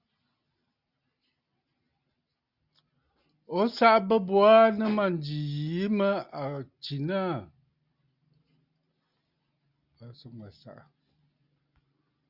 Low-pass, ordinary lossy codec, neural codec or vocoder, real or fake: 5.4 kHz; Opus, 64 kbps; none; real